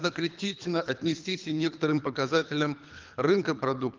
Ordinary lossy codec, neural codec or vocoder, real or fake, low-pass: Opus, 24 kbps; codec, 24 kHz, 3 kbps, HILCodec; fake; 7.2 kHz